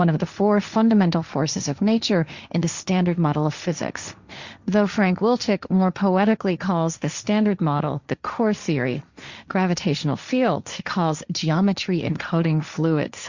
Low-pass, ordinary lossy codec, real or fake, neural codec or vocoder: 7.2 kHz; Opus, 64 kbps; fake; codec, 16 kHz, 1.1 kbps, Voila-Tokenizer